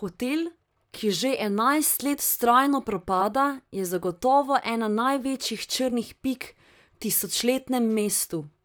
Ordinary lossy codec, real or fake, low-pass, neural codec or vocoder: none; fake; none; vocoder, 44.1 kHz, 128 mel bands, Pupu-Vocoder